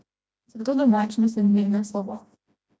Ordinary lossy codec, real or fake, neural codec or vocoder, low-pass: none; fake; codec, 16 kHz, 1 kbps, FreqCodec, smaller model; none